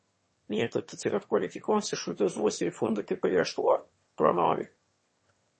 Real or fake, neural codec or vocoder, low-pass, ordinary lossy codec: fake; autoencoder, 22.05 kHz, a latent of 192 numbers a frame, VITS, trained on one speaker; 9.9 kHz; MP3, 32 kbps